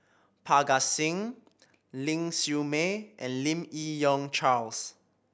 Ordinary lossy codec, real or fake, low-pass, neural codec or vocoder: none; real; none; none